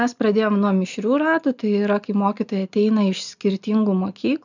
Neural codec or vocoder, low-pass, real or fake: none; 7.2 kHz; real